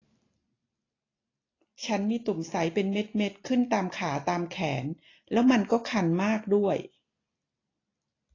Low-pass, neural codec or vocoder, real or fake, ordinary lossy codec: 7.2 kHz; none; real; AAC, 32 kbps